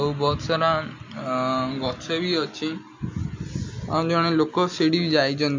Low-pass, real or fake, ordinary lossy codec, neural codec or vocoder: 7.2 kHz; real; MP3, 48 kbps; none